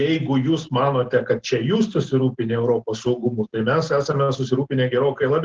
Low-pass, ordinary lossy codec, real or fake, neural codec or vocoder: 7.2 kHz; Opus, 16 kbps; real; none